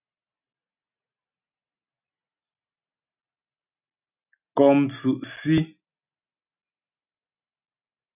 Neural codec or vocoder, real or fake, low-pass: none; real; 3.6 kHz